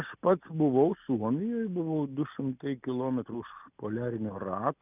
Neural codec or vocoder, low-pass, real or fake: none; 3.6 kHz; real